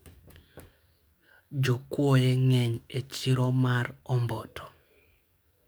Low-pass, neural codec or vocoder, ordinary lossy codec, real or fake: none; codec, 44.1 kHz, 7.8 kbps, DAC; none; fake